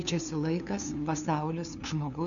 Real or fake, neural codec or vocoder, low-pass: fake; codec, 16 kHz, 2 kbps, FunCodec, trained on LibriTTS, 25 frames a second; 7.2 kHz